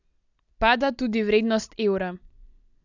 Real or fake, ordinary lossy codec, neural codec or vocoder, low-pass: real; none; none; 7.2 kHz